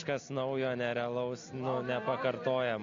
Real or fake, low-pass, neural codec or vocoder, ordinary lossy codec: real; 7.2 kHz; none; AAC, 48 kbps